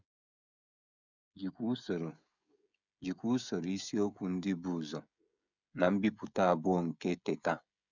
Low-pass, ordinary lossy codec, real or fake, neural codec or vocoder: 7.2 kHz; none; fake; codec, 16 kHz, 16 kbps, FreqCodec, smaller model